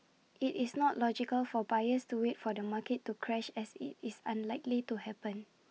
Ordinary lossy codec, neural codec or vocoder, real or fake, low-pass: none; none; real; none